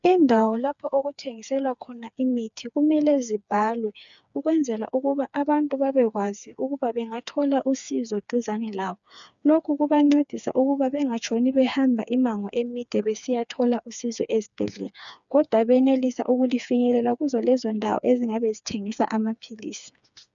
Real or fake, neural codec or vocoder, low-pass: fake; codec, 16 kHz, 4 kbps, FreqCodec, smaller model; 7.2 kHz